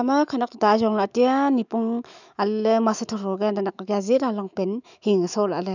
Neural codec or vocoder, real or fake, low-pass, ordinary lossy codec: autoencoder, 48 kHz, 128 numbers a frame, DAC-VAE, trained on Japanese speech; fake; 7.2 kHz; none